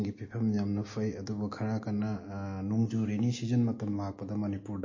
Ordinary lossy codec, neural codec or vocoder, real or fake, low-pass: MP3, 32 kbps; none; real; 7.2 kHz